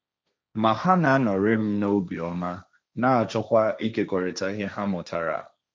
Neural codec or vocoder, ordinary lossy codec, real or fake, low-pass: codec, 16 kHz, 1.1 kbps, Voila-Tokenizer; none; fake; none